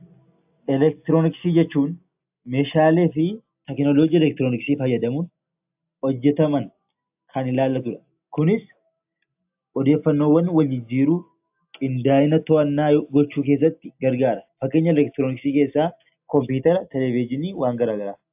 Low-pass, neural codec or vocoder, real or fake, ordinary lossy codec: 3.6 kHz; none; real; AAC, 32 kbps